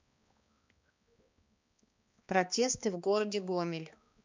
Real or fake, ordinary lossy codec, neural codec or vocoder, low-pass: fake; MP3, 64 kbps; codec, 16 kHz, 2 kbps, X-Codec, HuBERT features, trained on balanced general audio; 7.2 kHz